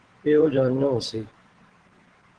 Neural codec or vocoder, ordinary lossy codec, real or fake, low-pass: vocoder, 22.05 kHz, 80 mel bands, WaveNeXt; Opus, 16 kbps; fake; 9.9 kHz